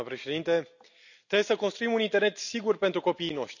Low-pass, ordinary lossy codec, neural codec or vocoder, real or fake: 7.2 kHz; none; none; real